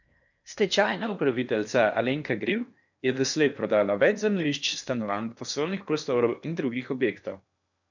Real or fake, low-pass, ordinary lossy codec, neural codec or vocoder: fake; 7.2 kHz; none; codec, 16 kHz in and 24 kHz out, 0.8 kbps, FocalCodec, streaming, 65536 codes